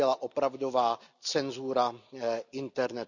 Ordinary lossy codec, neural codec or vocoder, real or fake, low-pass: none; none; real; 7.2 kHz